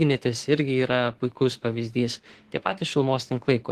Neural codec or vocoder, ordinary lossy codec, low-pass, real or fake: autoencoder, 48 kHz, 32 numbers a frame, DAC-VAE, trained on Japanese speech; Opus, 16 kbps; 14.4 kHz; fake